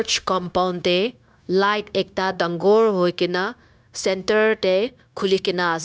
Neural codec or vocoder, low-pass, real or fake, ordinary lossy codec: codec, 16 kHz, 0.9 kbps, LongCat-Audio-Codec; none; fake; none